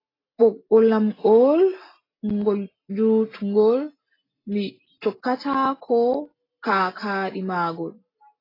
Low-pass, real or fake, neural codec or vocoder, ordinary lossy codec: 5.4 kHz; real; none; AAC, 24 kbps